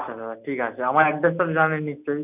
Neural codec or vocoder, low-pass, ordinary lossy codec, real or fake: none; 3.6 kHz; none; real